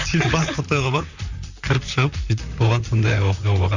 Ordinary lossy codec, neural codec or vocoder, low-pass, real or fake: none; vocoder, 44.1 kHz, 128 mel bands, Pupu-Vocoder; 7.2 kHz; fake